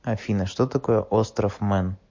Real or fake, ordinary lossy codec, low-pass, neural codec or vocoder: real; MP3, 48 kbps; 7.2 kHz; none